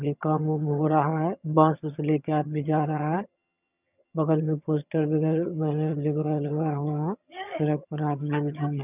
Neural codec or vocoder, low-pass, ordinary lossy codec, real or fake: vocoder, 22.05 kHz, 80 mel bands, HiFi-GAN; 3.6 kHz; none; fake